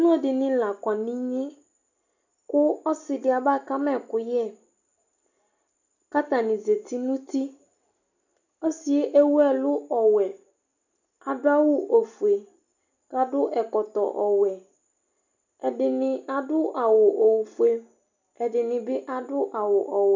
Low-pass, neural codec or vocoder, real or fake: 7.2 kHz; none; real